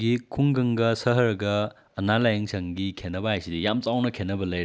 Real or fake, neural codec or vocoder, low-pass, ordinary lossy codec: real; none; none; none